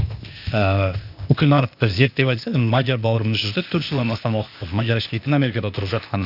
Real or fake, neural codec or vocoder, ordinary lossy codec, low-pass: fake; codec, 16 kHz, 0.8 kbps, ZipCodec; none; 5.4 kHz